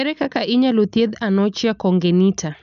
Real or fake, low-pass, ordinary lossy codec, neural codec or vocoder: real; 7.2 kHz; none; none